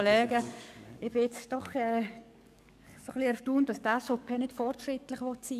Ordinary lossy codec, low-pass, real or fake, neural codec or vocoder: none; 14.4 kHz; fake; codec, 44.1 kHz, 7.8 kbps, DAC